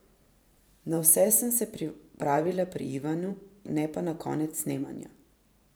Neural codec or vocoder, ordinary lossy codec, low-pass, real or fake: vocoder, 44.1 kHz, 128 mel bands every 512 samples, BigVGAN v2; none; none; fake